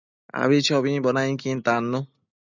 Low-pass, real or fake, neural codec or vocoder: 7.2 kHz; real; none